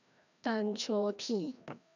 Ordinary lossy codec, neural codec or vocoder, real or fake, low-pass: none; codec, 16 kHz, 1 kbps, FreqCodec, larger model; fake; 7.2 kHz